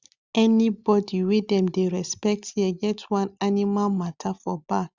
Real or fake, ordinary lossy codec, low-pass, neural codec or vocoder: real; Opus, 64 kbps; 7.2 kHz; none